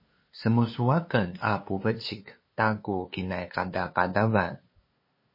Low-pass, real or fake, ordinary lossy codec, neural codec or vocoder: 5.4 kHz; fake; MP3, 24 kbps; codec, 16 kHz, 2 kbps, FunCodec, trained on LibriTTS, 25 frames a second